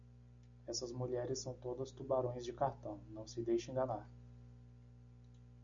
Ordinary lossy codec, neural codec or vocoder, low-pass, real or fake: MP3, 96 kbps; none; 7.2 kHz; real